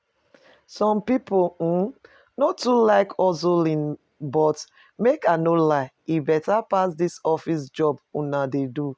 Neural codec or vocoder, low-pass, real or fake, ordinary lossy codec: none; none; real; none